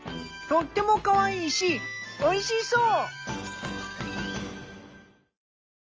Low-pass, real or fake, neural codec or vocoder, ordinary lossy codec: 7.2 kHz; real; none; Opus, 24 kbps